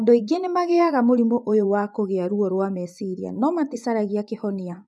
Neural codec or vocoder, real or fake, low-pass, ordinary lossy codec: vocoder, 24 kHz, 100 mel bands, Vocos; fake; none; none